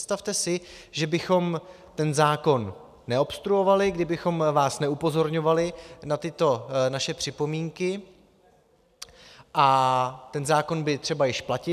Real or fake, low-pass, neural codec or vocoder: real; 14.4 kHz; none